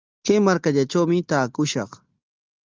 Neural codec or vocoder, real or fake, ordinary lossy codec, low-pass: none; real; Opus, 24 kbps; 7.2 kHz